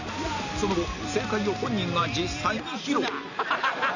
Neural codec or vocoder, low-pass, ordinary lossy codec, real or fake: none; 7.2 kHz; none; real